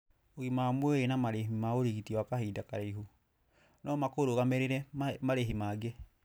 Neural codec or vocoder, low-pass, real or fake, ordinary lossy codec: none; none; real; none